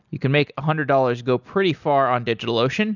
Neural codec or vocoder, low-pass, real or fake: none; 7.2 kHz; real